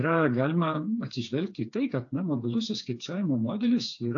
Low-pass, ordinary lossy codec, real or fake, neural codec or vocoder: 7.2 kHz; AAC, 48 kbps; fake; codec, 16 kHz, 4 kbps, FreqCodec, smaller model